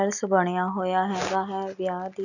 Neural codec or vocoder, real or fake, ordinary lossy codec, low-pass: none; real; none; 7.2 kHz